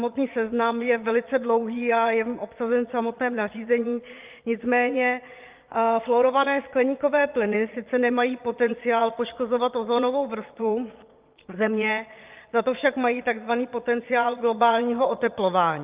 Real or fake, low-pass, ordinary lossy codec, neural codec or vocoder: fake; 3.6 kHz; Opus, 32 kbps; vocoder, 22.05 kHz, 80 mel bands, Vocos